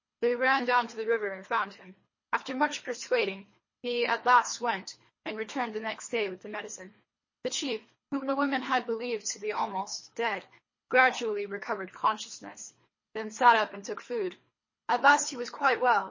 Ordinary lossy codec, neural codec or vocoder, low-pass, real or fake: MP3, 32 kbps; codec, 24 kHz, 3 kbps, HILCodec; 7.2 kHz; fake